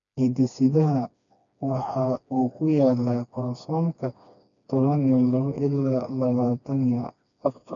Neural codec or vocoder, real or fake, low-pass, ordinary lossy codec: codec, 16 kHz, 2 kbps, FreqCodec, smaller model; fake; 7.2 kHz; none